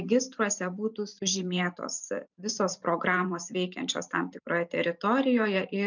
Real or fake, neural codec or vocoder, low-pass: fake; vocoder, 44.1 kHz, 128 mel bands every 512 samples, BigVGAN v2; 7.2 kHz